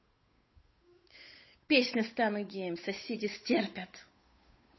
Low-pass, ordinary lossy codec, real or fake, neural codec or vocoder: 7.2 kHz; MP3, 24 kbps; fake; codec, 16 kHz, 8 kbps, FunCodec, trained on Chinese and English, 25 frames a second